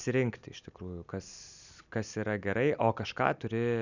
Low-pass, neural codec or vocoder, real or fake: 7.2 kHz; none; real